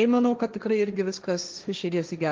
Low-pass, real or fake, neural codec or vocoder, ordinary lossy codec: 7.2 kHz; fake; codec, 16 kHz, 1.1 kbps, Voila-Tokenizer; Opus, 24 kbps